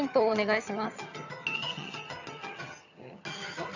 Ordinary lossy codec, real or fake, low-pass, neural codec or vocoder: none; fake; 7.2 kHz; vocoder, 22.05 kHz, 80 mel bands, HiFi-GAN